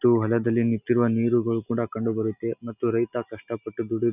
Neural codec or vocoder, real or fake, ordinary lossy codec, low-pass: none; real; none; 3.6 kHz